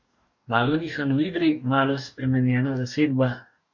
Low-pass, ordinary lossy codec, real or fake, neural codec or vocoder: 7.2 kHz; none; fake; codec, 44.1 kHz, 2.6 kbps, DAC